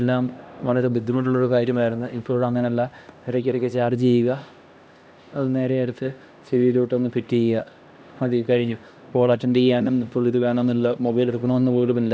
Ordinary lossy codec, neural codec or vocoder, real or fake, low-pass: none; codec, 16 kHz, 1 kbps, X-Codec, HuBERT features, trained on LibriSpeech; fake; none